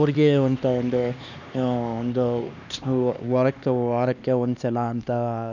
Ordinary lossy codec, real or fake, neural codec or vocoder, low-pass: none; fake; codec, 16 kHz, 2 kbps, X-Codec, HuBERT features, trained on LibriSpeech; 7.2 kHz